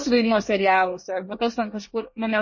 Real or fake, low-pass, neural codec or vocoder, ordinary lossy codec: fake; 7.2 kHz; codec, 24 kHz, 1 kbps, SNAC; MP3, 32 kbps